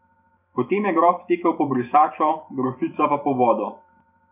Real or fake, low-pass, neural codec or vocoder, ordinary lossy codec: fake; 3.6 kHz; vocoder, 44.1 kHz, 128 mel bands every 512 samples, BigVGAN v2; none